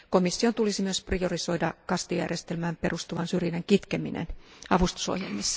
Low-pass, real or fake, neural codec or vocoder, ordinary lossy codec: none; real; none; none